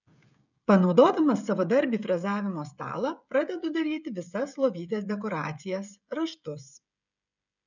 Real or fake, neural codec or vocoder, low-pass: fake; codec, 16 kHz, 16 kbps, FreqCodec, smaller model; 7.2 kHz